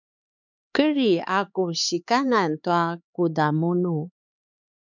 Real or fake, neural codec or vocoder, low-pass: fake; codec, 16 kHz, 4 kbps, X-Codec, HuBERT features, trained on LibriSpeech; 7.2 kHz